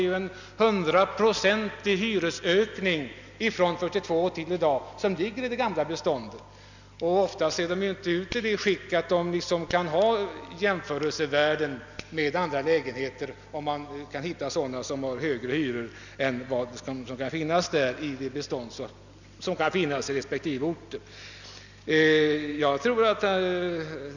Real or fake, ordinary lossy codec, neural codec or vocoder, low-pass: real; none; none; 7.2 kHz